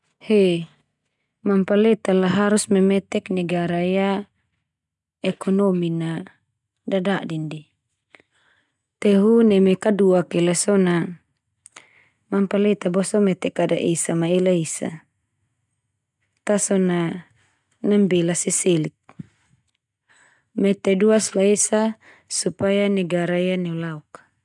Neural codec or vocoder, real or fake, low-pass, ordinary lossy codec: none; real; 10.8 kHz; none